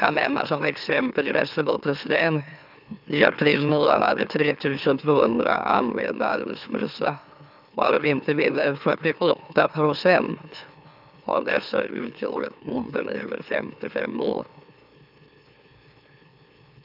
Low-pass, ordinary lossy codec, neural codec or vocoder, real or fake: 5.4 kHz; none; autoencoder, 44.1 kHz, a latent of 192 numbers a frame, MeloTTS; fake